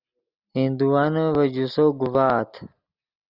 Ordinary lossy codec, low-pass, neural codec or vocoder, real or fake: Opus, 64 kbps; 5.4 kHz; none; real